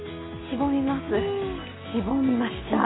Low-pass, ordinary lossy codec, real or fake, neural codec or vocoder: 7.2 kHz; AAC, 16 kbps; real; none